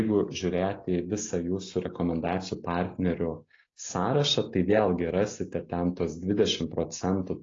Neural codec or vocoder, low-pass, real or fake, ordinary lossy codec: none; 7.2 kHz; real; AAC, 32 kbps